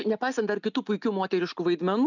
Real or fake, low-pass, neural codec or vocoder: real; 7.2 kHz; none